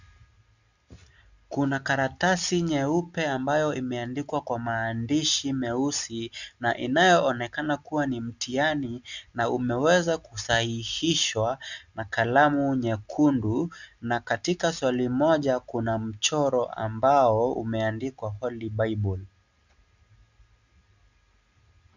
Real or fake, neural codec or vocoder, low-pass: real; none; 7.2 kHz